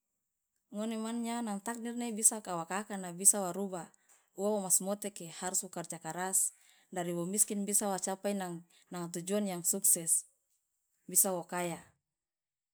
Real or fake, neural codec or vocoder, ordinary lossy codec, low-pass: real; none; none; none